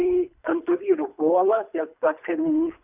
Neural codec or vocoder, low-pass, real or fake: codec, 24 kHz, 3 kbps, HILCodec; 3.6 kHz; fake